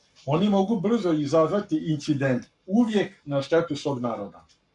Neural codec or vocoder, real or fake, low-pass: codec, 44.1 kHz, 7.8 kbps, Pupu-Codec; fake; 10.8 kHz